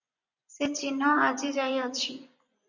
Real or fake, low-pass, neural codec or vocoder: fake; 7.2 kHz; vocoder, 24 kHz, 100 mel bands, Vocos